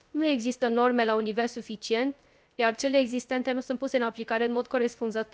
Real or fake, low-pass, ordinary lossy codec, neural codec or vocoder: fake; none; none; codec, 16 kHz, 0.3 kbps, FocalCodec